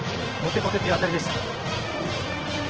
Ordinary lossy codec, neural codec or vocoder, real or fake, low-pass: Opus, 16 kbps; vocoder, 44.1 kHz, 80 mel bands, Vocos; fake; 7.2 kHz